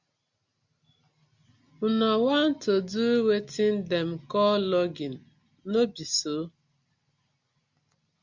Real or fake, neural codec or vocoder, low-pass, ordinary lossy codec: real; none; 7.2 kHz; Opus, 64 kbps